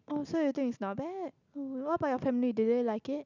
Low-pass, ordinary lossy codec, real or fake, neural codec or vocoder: 7.2 kHz; none; real; none